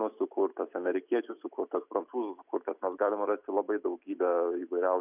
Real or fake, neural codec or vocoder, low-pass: real; none; 3.6 kHz